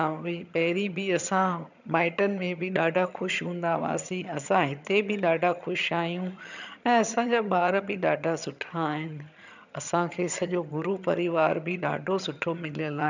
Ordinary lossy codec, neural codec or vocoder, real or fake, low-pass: none; vocoder, 22.05 kHz, 80 mel bands, HiFi-GAN; fake; 7.2 kHz